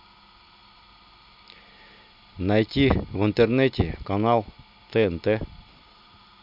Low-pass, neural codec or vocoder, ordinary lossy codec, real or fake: 5.4 kHz; none; none; real